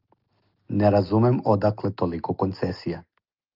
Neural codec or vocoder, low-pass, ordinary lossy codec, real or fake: none; 5.4 kHz; Opus, 24 kbps; real